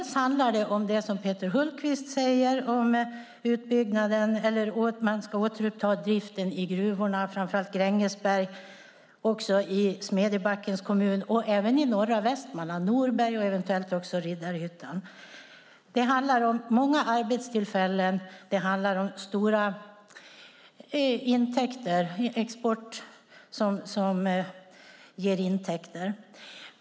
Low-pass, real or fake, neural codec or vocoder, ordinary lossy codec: none; real; none; none